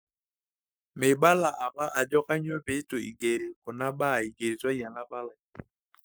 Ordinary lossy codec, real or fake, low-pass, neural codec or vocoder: none; fake; none; codec, 44.1 kHz, 7.8 kbps, Pupu-Codec